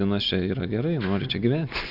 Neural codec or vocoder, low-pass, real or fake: none; 5.4 kHz; real